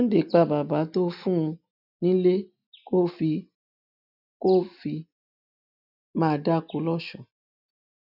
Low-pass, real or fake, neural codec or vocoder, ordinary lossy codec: 5.4 kHz; real; none; none